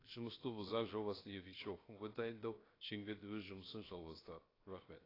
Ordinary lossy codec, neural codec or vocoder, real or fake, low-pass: AAC, 24 kbps; codec, 16 kHz, 0.7 kbps, FocalCodec; fake; 5.4 kHz